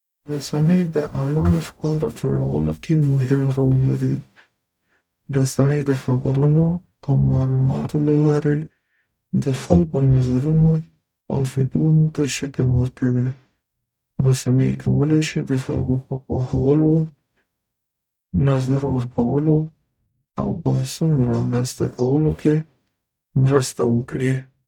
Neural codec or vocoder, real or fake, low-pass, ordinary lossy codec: codec, 44.1 kHz, 0.9 kbps, DAC; fake; 19.8 kHz; none